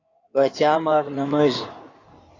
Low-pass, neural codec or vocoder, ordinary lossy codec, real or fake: 7.2 kHz; codec, 16 kHz in and 24 kHz out, 2.2 kbps, FireRedTTS-2 codec; AAC, 32 kbps; fake